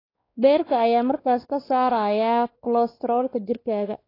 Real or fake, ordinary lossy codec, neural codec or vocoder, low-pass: fake; AAC, 24 kbps; codec, 16 kHz, 2 kbps, X-Codec, WavLM features, trained on Multilingual LibriSpeech; 5.4 kHz